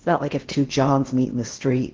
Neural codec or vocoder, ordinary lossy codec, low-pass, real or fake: codec, 16 kHz in and 24 kHz out, 0.6 kbps, FocalCodec, streaming, 4096 codes; Opus, 16 kbps; 7.2 kHz; fake